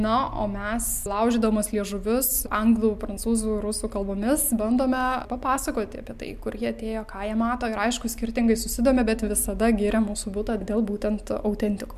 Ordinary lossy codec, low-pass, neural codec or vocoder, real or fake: MP3, 96 kbps; 14.4 kHz; none; real